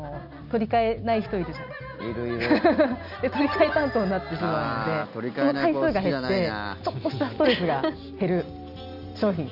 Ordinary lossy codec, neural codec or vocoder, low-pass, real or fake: none; none; 5.4 kHz; real